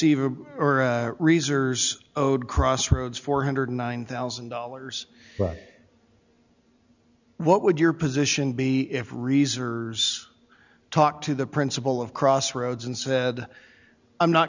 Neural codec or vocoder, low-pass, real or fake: none; 7.2 kHz; real